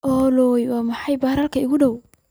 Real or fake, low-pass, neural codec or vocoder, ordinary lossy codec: real; none; none; none